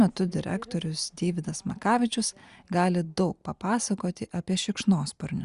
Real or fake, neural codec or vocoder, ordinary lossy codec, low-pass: real; none; Opus, 64 kbps; 10.8 kHz